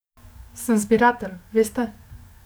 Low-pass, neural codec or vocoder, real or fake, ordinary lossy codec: none; codec, 44.1 kHz, 7.8 kbps, DAC; fake; none